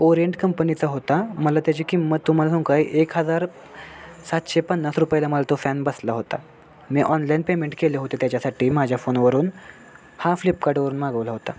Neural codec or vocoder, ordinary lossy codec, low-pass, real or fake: none; none; none; real